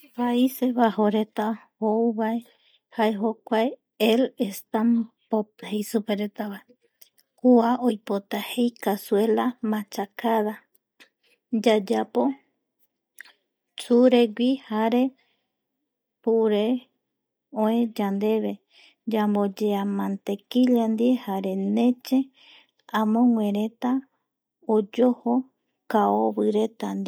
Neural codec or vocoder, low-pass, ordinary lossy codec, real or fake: none; none; none; real